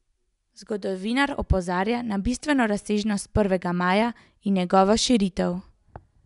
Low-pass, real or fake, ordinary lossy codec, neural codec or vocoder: 10.8 kHz; real; none; none